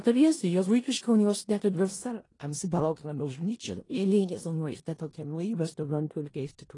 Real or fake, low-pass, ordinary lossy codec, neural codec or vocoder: fake; 10.8 kHz; AAC, 32 kbps; codec, 16 kHz in and 24 kHz out, 0.4 kbps, LongCat-Audio-Codec, four codebook decoder